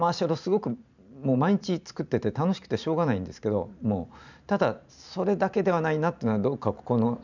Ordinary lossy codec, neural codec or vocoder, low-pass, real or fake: none; vocoder, 44.1 kHz, 128 mel bands every 512 samples, BigVGAN v2; 7.2 kHz; fake